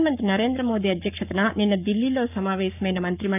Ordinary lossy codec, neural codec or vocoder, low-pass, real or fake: none; codec, 44.1 kHz, 7.8 kbps, Pupu-Codec; 3.6 kHz; fake